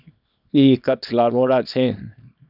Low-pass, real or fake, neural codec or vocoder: 5.4 kHz; fake; codec, 24 kHz, 0.9 kbps, WavTokenizer, small release